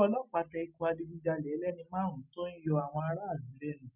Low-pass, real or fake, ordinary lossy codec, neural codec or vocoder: 3.6 kHz; real; none; none